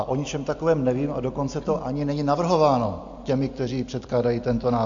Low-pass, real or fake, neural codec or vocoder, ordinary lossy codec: 7.2 kHz; real; none; MP3, 48 kbps